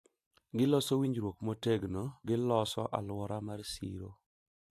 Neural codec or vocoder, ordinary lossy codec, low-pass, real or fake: none; AAC, 64 kbps; 14.4 kHz; real